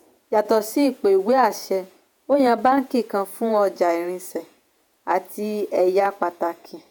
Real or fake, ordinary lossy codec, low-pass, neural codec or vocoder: fake; none; none; vocoder, 48 kHz, 128 mel bands, Vocos